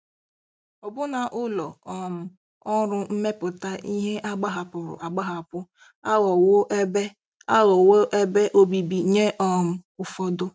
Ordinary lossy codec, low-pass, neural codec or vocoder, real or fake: none; none; none; real